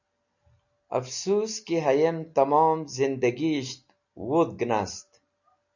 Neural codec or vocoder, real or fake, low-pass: none; real; 7.2 kHz